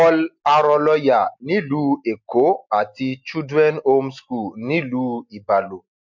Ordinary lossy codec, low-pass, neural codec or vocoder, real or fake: MP3, 48 kbps; 7.2 kHz; none; real